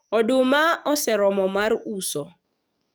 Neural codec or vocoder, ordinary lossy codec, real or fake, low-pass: codec, 44.1 kHz, 7.8 kbps, DAC; none; fake; none